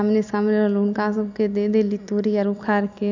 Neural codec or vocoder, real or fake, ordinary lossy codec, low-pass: none; real; none; 7.2 kHz